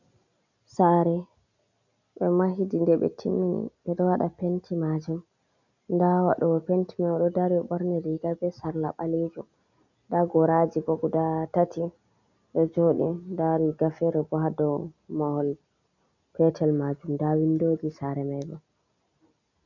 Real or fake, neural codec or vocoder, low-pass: real; none; 7.2 kHz